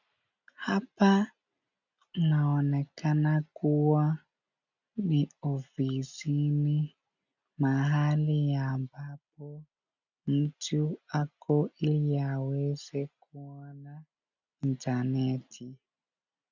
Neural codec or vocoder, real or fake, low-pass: none; real; 7.2 kHz